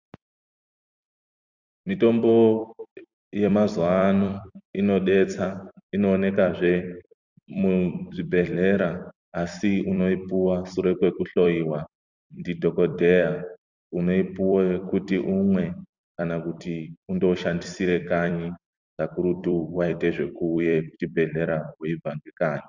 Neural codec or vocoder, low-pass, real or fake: vocoder, 44.1 kHz, 128 mel bands every 256 samples, BigVGAN v2; 7.2 kHz; fake